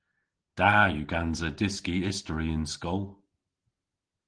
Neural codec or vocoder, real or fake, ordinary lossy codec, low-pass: none; real; Opus, 16 kbps; 9.9 kHz